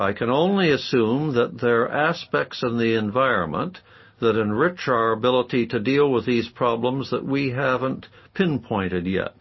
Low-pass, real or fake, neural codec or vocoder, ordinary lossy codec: 7.2 kHz; real; none; MP3, 24 kbps